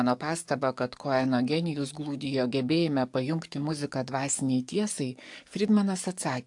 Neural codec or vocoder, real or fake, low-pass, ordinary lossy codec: codec, 44.1 kHz, 7.8 kbps, DAC; fake; 10.8 kHz; AAC, 64 kbps